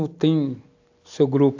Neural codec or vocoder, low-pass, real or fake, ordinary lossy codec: none; 7.2 kHz; real; AAC, 48 kbps